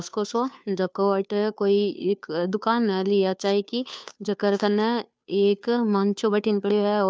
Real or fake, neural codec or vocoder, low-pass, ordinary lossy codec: fake; codec, 16 kHz, 2 kbps, FunCodec, trained on Chinese and English, 25 frames a second; none; none